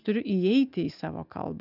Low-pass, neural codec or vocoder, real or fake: 5.4 kHz; none; real